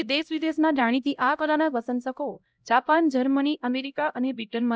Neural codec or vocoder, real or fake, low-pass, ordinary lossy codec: codec, 16 kHz, 0.5 kbps, X-Codec, HuBERT features, trained on LibriSpeech; fake; none; none